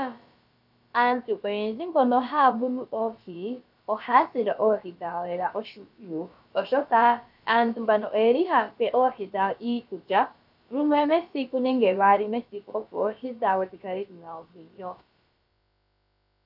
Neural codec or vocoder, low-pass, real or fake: codec, 16 kHz, about 1 kbps, DyCAST, with the encoder's durations; 5.4 kHz; fake